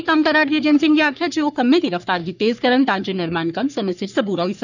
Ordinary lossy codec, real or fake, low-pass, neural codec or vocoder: none; fake; 7.2 kHz; codec, 44.1 kHz, 3.4 kbps, Pupu-Codec